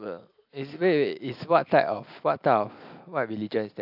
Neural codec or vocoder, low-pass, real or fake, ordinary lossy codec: none; 5.4 kHz; real; AAC, 48 kbps